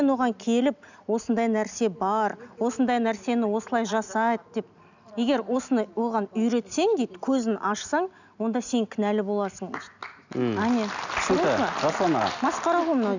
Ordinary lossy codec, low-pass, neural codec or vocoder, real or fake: none; 7.2 kHz; none; real